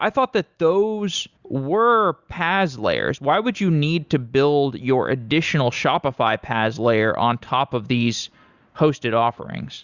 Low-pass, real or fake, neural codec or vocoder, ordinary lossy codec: 7.2 kHz; real; none; Opus, 64 kbps